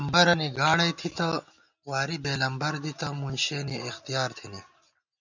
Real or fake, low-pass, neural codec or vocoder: real; 7.2 kHz; none